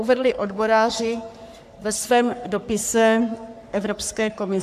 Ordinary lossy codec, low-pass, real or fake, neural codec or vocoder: AAC, 96 kbps; 14.4 kHz; fake; codec, 44.1 kHz, 3.4 kbps, Pupu-Codec